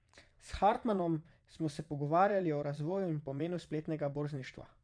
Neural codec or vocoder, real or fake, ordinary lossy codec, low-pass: vocoder, 22.05 kHz, 80 mel bands, Vocos; fake; none; 9.9 kHz